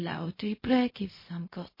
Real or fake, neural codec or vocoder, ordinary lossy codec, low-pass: fake; codec, 16 kHz, 0.4 kbps, LongCat-Audio-Codec; MP3, 24 kbps; 5.4 kHz